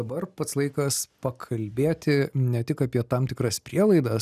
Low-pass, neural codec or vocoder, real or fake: 14.4 kHz; none; real